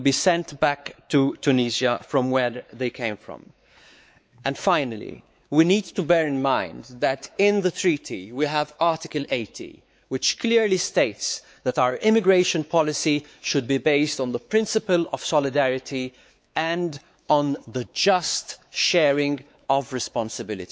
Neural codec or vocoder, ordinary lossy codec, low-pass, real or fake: codec, 16 kHz, 4 kbps, X-Codec, WavLM features, trained on Multilingual LibriSpeech; none; none; fake